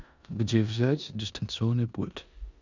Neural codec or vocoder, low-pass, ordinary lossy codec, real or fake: codec, 16 kHz in and 24 kHz out, 0.9 kbps, LongCat-Audio-Codec, four codebook decoder; 7.2 kHz; none; fake